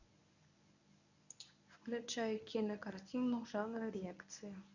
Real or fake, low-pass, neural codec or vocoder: fake; 7.2 kHz; codec, 24 kHz, 0.9 kbps, WavTokenizer, medium speech release version 1